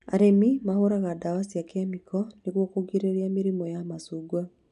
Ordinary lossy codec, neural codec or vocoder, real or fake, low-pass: none; none; real; 10.8 kHz